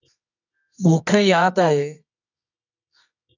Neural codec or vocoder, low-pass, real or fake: codec, 24 kHz, 0.9 kbps, WavTokenizer, medium music audio release; 7.2 kHz; fake